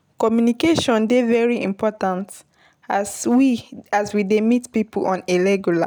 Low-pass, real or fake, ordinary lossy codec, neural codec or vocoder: none; real; none; none